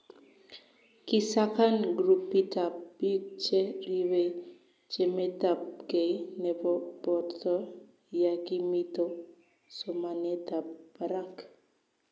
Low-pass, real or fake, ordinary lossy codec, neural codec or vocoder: none; real; none; none